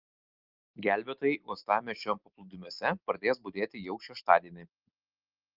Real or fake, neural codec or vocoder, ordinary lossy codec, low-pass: real; none; Opus, 32 kbps; 5.4 kHz